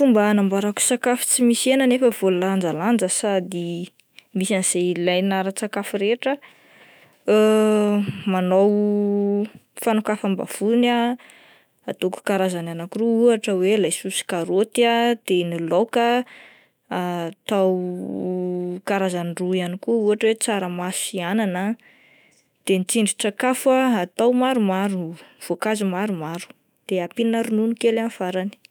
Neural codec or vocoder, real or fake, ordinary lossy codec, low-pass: autoencoder, 48 kHz, 128 numbers a frame, DAC-VAE, trained on Japanese speech; fake; none; none